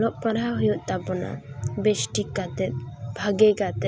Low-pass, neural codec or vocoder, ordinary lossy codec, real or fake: none; none; none; real